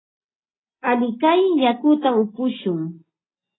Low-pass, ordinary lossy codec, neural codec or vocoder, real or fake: 7.2 kHz; AAC, 16 kbps; none; real